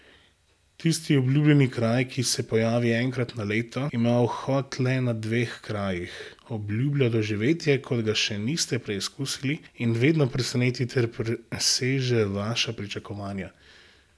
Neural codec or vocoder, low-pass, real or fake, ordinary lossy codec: none; none; real; none